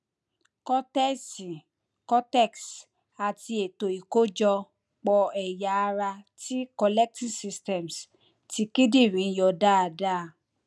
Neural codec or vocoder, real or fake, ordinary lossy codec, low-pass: none; real; none; none